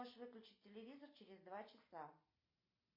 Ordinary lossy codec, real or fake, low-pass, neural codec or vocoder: MP3, 32 kbps; real; 5.4 kHz; none